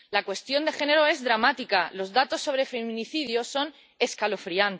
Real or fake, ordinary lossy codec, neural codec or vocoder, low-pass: real; none; none; none